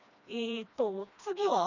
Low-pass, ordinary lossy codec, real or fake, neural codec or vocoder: 7.2 kHz; none; fake; codec, 16 kHz, 2 kbps, FreqCodec, smaller model